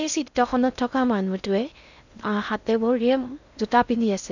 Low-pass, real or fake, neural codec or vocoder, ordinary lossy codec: 7.2 kHz; fake; codec, 16 kHz in and 24 kHz out, 0.6 kbps, FocalCodec, streaming, 2048 codes; none